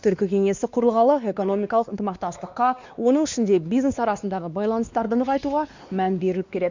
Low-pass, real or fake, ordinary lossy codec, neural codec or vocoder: 7.2 kHz; fake; Opus, 64 kbps; codec, 16 kHz, 2 kbps, X-Codec, WavLM features, trained on Multilingual LibriSpeech